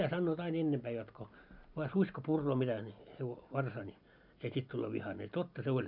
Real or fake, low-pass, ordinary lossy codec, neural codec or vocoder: real; 5.4 kHz; none; none